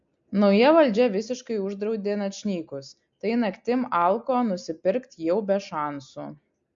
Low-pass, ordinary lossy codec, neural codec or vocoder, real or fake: 7.2 kHz; MP3, 48 kbps; none; real